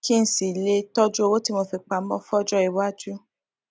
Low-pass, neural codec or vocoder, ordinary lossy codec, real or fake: none; none; none; real